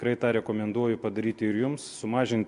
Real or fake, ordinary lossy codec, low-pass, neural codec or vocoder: real; MP3, 64 kbps; 10.8 kHz; none